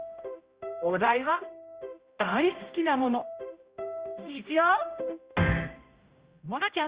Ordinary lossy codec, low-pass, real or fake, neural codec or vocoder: Opus, 32 kbps; 3.6 kHz; fake; codec, 16 kHz, 0.5 kbps, X-Codec, HuBERT features, trained on general audio